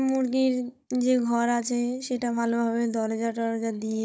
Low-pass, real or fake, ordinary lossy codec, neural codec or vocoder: none; fake; none; codec, 16 kHz, 16 kbps, FunCodec, trained on Chinese and English, 50 frames a second